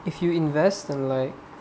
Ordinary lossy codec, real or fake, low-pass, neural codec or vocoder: none; real; none; none